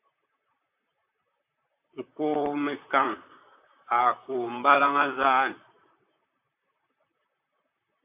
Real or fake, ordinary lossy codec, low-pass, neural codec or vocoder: fake; AAC, 32 kbps; 3.6 kHz; vocoder, 44.1 kHz, 128 mel bands, Pupu-Vocoder